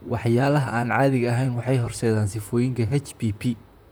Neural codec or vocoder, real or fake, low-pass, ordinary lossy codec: vocoder, 44.1 kHz, 128 mel bands, Pupu-Vocoder; fake; none; none